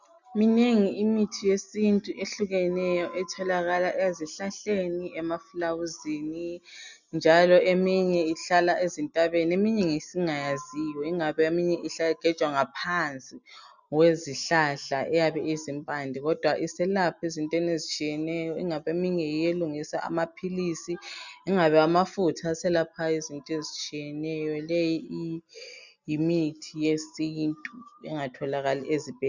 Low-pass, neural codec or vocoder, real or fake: 7.2 kHz; none; real